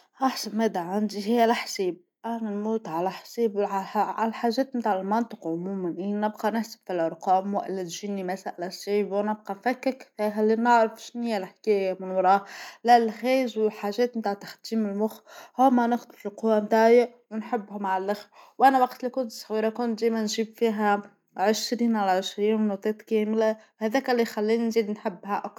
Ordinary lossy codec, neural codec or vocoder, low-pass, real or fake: none; none; 19.8 kHz; real